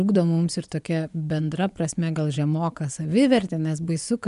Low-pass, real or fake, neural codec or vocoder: 10.8 kHz; fake; vocoder, 24 kHz, 100 mel bands, Vocos